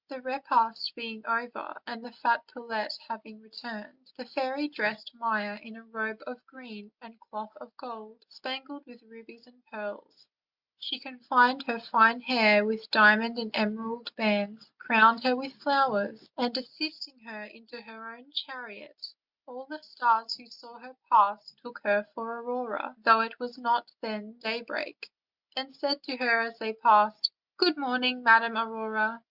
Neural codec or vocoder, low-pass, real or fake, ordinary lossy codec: none; 5.4 kHz; real; Opus, 64 kbps